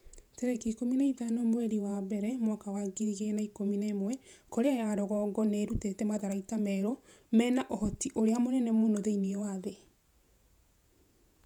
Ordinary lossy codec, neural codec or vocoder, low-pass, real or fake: none; vocoder, 48 kHz, 128 mel bands, Vocos; 19.8 kHz; fake